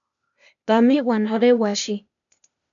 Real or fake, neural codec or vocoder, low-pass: fake; codec, 16 kHz, 0.8 kbps, ZipCodec; 7.2 kHz